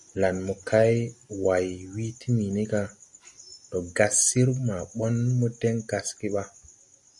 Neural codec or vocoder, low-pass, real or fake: none; 10.8 kHz; real